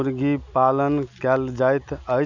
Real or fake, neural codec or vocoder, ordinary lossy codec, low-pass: real; none; none; 7.2 kHz